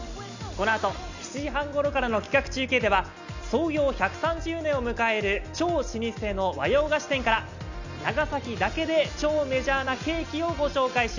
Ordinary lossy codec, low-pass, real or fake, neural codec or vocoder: none; 7.2 kHz; real; none